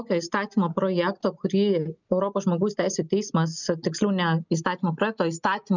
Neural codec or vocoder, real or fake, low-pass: none; real; 7.2 kHz